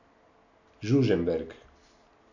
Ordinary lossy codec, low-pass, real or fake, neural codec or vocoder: none; 7.2 kHz; real; none